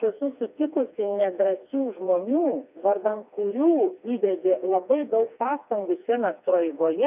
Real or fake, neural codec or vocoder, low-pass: fake; codec, 16 kHz, 2 kbps, FreqCodec, smaller model; 3.6 kHz